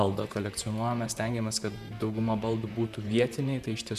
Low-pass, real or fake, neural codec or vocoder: 14.4 kHz; fake; vocoder, 44.1 kHz, 128 mel bands, Pupu-Vocoder